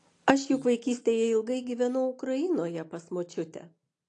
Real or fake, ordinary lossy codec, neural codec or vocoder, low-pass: real; AAC, 48 kbps; none; 10.8 kHz